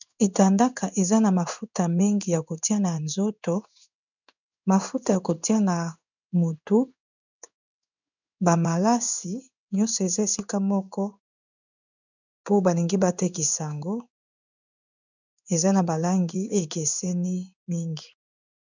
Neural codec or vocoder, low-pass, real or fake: codec, 16 kHz in and 24 kHz out, 1 kbps, XY-Tokenizer; 7.2 kHz; fake